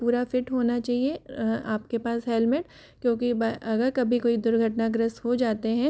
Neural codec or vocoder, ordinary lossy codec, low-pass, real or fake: none; none; none; real